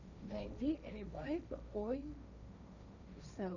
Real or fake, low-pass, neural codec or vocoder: fake; 7.2 kHz; codec, 16 kHz, 1.1 kbps, Voila-Tokenizer